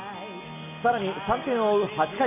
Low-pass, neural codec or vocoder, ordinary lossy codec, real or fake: 3.6 kHz; none; none; real